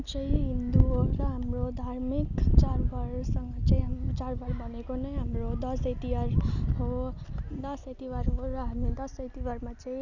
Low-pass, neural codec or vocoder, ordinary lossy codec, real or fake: 7.2 kHz; none; none; real